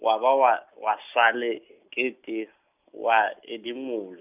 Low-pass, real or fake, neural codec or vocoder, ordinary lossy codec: 3.6 kHz; real; none; none